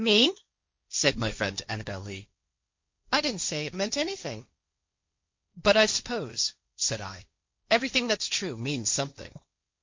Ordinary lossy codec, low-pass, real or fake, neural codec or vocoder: MP3, 48 kbps; 7.2 kHz; fake; codec, 16 kHz, 1.1 kbps, Voila-Tokenizer